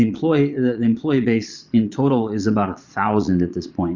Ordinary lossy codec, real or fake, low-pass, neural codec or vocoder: Opus, 64 kbps; fake; 7.2 kHz; vocoder, 22.05 kHz, 80 mel bands, Vocos